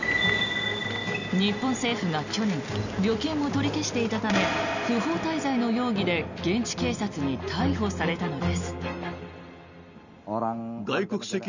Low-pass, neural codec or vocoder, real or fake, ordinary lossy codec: 7.2 kHz; none; real; none